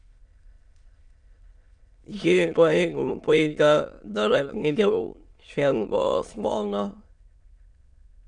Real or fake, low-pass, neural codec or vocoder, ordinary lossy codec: fake; 9.9 kHz; autoencoder, 22.05 kHz, a latent of 192 numbers a frame, VITS, trained on many speakers; MP3, 96 kbps